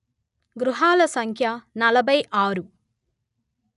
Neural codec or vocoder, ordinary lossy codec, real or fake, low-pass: none; none; real; 10.8 kHz